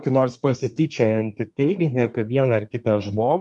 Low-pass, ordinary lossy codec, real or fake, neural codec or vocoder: 10.8 kHz; MP3, 96 kbps; fake; codec, 24 kHz, 1 kbps, SNAC